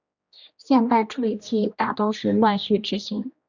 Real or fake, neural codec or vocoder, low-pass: fake; codec, 16 kHz, 1 kbps, X-Codec, HuBERT features, trained on general audio; 7.2 kHz